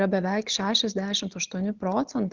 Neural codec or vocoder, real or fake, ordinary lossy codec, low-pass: none; real; Opus, 24 kbps; 7.2 kHz